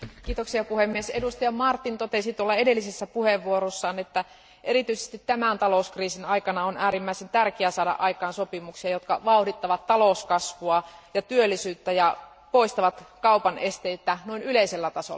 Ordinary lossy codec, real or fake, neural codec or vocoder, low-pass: none; real; none; none